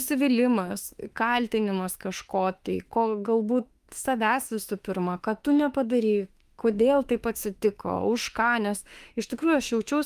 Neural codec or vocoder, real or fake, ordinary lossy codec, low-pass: autoencoder, 48 kHz, 32 numbers a frame, DAC-VAE, trained on Japanese speech; fake; Opus, 32 kbps; 14.4 kHz